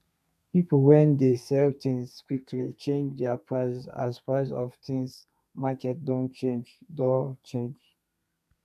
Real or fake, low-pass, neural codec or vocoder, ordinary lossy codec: fake; 14.4 kHz; codec, 32 kHz, 1.9 kbps, SNAC; none